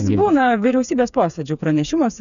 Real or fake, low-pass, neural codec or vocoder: fake; 7.2 kHz; codec, 16 kHz, 4 kbps, FreqCodec, smaller model